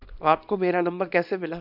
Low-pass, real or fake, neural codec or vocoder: 5.4 kHz; fake; codec, 24 kHz, 0.9 kbps, WavTokenizer, small release